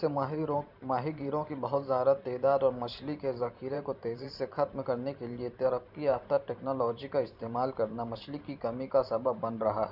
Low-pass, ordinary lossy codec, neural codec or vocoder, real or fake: 5.4 kHz; none; vocoder, 44.1 kHz, 128 mel bands every 512 samples, BigVGAN v2; fake